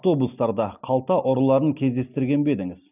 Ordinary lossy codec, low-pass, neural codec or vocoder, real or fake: none; 3.6 kHz; none; real